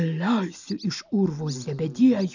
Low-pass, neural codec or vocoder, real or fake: 7.2 kHz; none; real